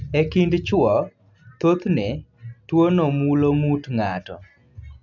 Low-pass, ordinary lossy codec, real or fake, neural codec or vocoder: 7.2 kHz; none; real; none